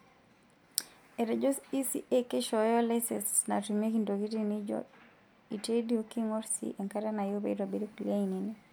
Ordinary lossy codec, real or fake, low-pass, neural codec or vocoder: none; real; none; none